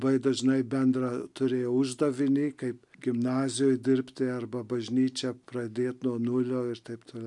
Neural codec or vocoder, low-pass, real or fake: none; 10.8 kHz; real